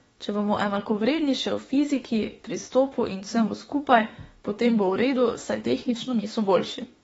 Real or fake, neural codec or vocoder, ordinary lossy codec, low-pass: fake; autoencoder, 48 kHz, 32 numbers a frame, DAC-VAE, trained on Japanese speech; AAC, 24 kbps; 19.8 kHz